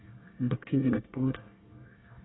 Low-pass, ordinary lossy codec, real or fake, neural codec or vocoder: 7.2 kHz; AAC, 16 kbps; fake; codec, 24 kHz, 1 kbps, SNAC